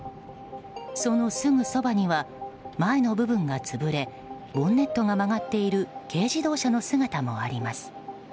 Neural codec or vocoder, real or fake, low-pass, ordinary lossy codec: none; real; none; none